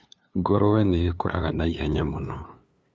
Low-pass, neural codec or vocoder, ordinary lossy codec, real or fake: none; codec, 16 kHz, 2 kbps, FunCodec, trained on LibriTTS, 25 frames a second; none; fake